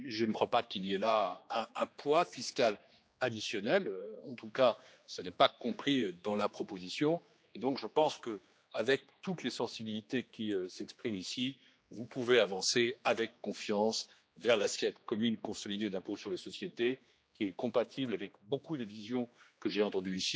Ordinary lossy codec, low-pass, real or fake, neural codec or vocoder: none; none; fake; codec, 16 kHz, 2 kbps, X-Codec, HuBERT features, trained on general audio